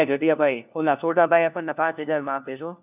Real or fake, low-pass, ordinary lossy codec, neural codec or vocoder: fake; 3.6 kHz; none; codec, 16 kHz, 1 kbps, FunCodec, trained on LibriTTS, 50 frames a second